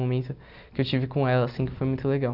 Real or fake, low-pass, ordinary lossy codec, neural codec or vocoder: real; 5.4 kHz; none; none